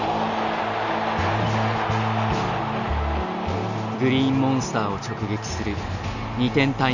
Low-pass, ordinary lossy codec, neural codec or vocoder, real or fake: 7.2 kHz; none; none; real